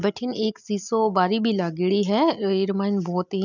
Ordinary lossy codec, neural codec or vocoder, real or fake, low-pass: none; none; real; 7.2 kHz